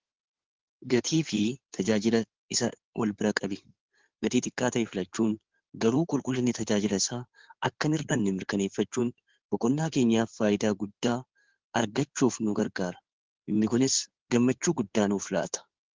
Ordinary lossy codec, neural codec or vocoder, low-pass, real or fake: Opus, 16 kbps; codec, 16 kHz in and 24 kHz out, 2.2 kbps, FireRedTTS-2 codec; 7.2 kHz; fake